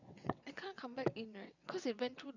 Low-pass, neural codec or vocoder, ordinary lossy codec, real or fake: 7.2 kHz; vocoder, 22.05 kHz, 80 mel bands, WaveNeXt; none; fake